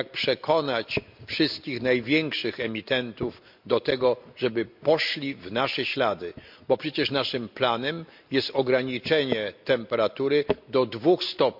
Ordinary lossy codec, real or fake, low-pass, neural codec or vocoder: MP3, 48 kbps; real; 5.4 kHz; none